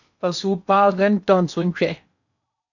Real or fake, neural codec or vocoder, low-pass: fake; codec, 16 kHz in and 24 kHz out, 0.8 kbps, FocalCodec, streaming, 65536 codes; 7.2 kHz